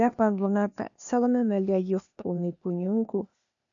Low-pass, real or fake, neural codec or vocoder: 7.2 kHz; fake; codec, 16 kHz, 0.8 kbps, ZipCodec